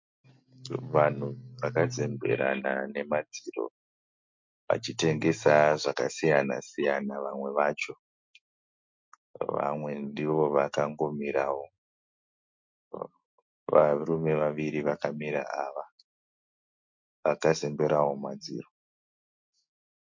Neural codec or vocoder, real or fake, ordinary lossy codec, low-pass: none; real; MP3, 48 kbps; 7.2 kHz